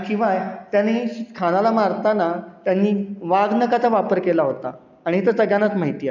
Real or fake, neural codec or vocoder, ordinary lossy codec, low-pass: real; none; none; 7.2 kHz